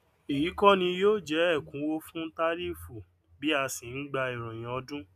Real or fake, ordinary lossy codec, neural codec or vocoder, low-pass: real; none; none; 14.4 kHz